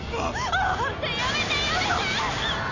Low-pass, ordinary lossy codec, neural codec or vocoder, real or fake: 7.2 kHz; none; none; real